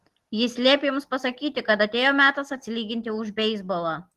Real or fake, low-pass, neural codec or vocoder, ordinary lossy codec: real; 14.4 kHz; none; Opus, 16 kbps